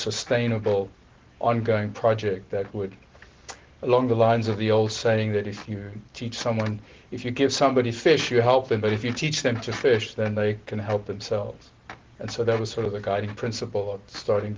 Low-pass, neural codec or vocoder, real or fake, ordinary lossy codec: 7.2 kHz; none; real; Opus, 16 kbps